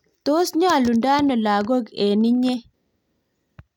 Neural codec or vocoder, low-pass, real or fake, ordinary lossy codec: none; 19.8 kHz; real; none